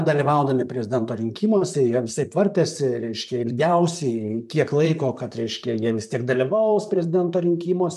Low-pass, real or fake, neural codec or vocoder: 14.4 kHz; fake; vocoder, 44.1 kHz, 128 mel bands, Pupu-Vocoder